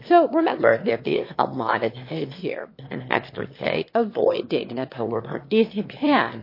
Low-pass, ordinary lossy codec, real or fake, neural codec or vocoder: 5.4 kHz; MP3, 32 kbps; fake; autoencoder, 22.05 kHz, a latent of 192 numbers a frame, VITS, trained on one speaker